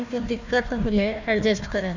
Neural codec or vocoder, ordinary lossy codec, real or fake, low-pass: codec, 16 kHz, 1 kbps, FunCodec, trained on Chinese and English, 50 frames a second; none; fake; 7.2 kHz